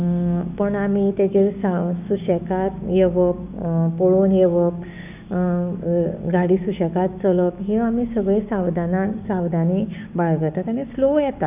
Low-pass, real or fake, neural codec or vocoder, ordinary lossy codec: 3.6 kHz; fake; codec, 16 kHz, 6 kbps, DAC; none